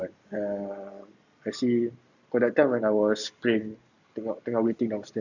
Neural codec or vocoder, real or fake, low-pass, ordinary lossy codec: none; real; 7.2 kHz; Opus, 64 kbps